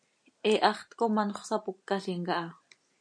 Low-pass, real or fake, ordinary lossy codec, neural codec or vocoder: 9.9 kHz; real; MP3, 64 kbps; none